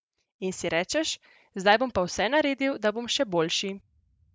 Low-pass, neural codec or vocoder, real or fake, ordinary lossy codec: none; none; real; none